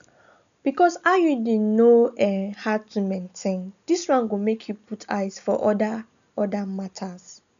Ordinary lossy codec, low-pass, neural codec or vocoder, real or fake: none; 7.2 kHz; none; real